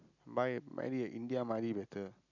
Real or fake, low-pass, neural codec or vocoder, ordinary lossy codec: real; 7.2 kHz; none; none